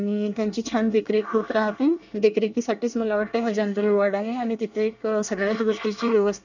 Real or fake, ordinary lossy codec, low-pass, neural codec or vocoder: fake; none; 7.2 kHz; codec, 24 kHz, 1 kbps, SNAC